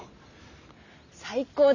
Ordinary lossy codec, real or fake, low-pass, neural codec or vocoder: none; real; 7.2 kHz; none